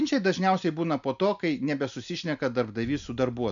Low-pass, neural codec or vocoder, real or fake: 7.2 kHz; none; real